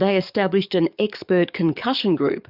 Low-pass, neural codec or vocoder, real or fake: 5.4 kHz; codec, 44.1 kHz, 7.8 kbps, DAC; fake